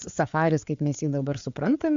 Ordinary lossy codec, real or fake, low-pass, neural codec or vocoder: MP3, 64 kbps; fake; 7.2 kHz; codec, 16 kHz, 4 kbps, FreqCodec, larger model